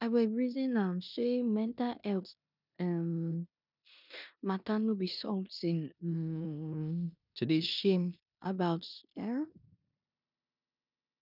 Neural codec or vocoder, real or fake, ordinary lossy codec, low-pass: codec, 16 kHz in and 24 kHz out, 0.9 kbps, LongCat-Audio-Codec, four codebook decoder; fake; none; 5.4 kHz